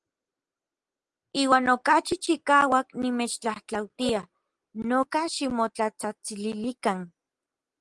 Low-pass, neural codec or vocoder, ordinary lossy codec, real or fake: 10.8 kHz; none; Opus, 24 kbps; real